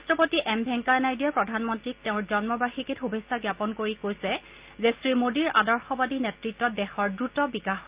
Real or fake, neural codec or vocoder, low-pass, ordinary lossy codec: real; none; 3.6 kHz; Opus, 32 kbps